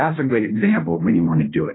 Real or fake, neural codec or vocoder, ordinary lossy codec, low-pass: fake; codec, 16 kHz, 0.5 kbps, FunCodec, trained on Chinese and English, 25 frames a second; AAC, 16 kbps; 7.2 kHz